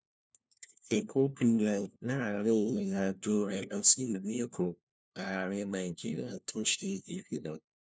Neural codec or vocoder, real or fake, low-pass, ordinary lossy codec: codec, 16 kHz, 1 kbps, FunCodec, trained on LibriTTS, 50 frames a second; fake; none; none